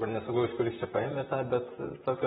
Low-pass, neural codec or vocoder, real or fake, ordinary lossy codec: 19.8 kHz; vocoder, 44.1 kHz, 128 mel bands, Pupu-Vocoder; fake; AAC, 16 kbps